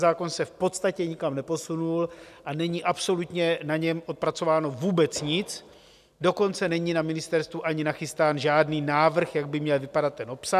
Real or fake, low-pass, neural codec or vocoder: real; 14.4 kHz; none